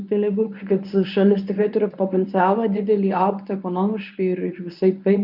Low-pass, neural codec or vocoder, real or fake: 5.4 kHz; codec, 24 kHz, 0.9 kbps, WavTokenizer, medium speech release version 1; fake